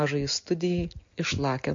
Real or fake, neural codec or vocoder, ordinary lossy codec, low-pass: real; none; MP3, 48 kbps; 7.2 kHz